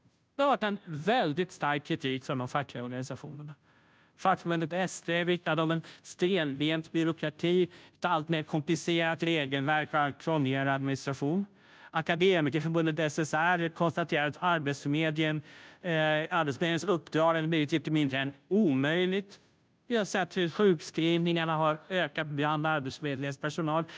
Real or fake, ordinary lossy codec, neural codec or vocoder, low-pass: fake; none; codec, 16 kHz, 0.5 kbps, FunCodec, trained on Chinese and English, 25 frames a second; none